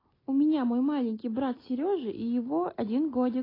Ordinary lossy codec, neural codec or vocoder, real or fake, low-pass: AAC, 24 kbps; none; real; 5.4 kHz